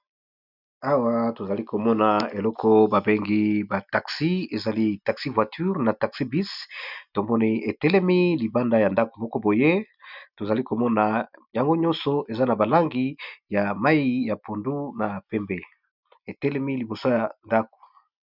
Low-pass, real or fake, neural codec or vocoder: 5.4 kHz; real; none